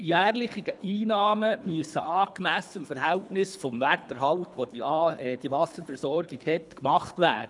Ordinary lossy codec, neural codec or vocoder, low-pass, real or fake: none; codec, 24 kHz, 3 kbps, HILCodec; 10.8 kHz; fake